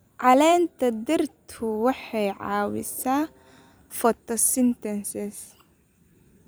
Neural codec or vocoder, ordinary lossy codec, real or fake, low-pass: vocoder, 44.1 kHz, 128 mel bands every 256 samples, BigVGAN v2; none; fake; none